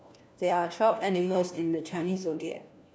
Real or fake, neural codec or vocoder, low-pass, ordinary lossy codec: fake; codec, 16 kHz, 1 kbps, FunCodec, trained on LibriTTS, 50 frames a second; none; none